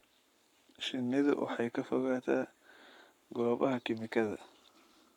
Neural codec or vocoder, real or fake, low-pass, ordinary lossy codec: codec, 44.1 kHz, 7.8 kbps, Pupu-Codec; fake; 19.8 kHz; none